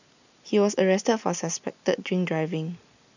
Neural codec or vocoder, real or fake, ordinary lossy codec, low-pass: none; real; none; 7.2 kHz